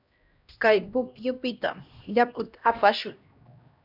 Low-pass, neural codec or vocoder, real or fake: 5.4 kHz; codec, 16 kHz, 1 kbps, X-Codec, HuBERT features, trained on LibriSpeech; fake